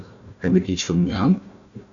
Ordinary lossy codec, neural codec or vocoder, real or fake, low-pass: MP3, 96 kbps; codec, 16 kHz, 1 kbps, FunCodec, trained on Chinese and English, 50 frames a second; fake; 7.2 kHz